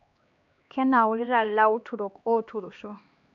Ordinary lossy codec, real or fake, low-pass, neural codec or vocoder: AAC, 64 kbps; fake; 7.2 kHz; codec, 16 kHz, 2 kbps, X-Codec, HuBERT features, trained on LibriSpeech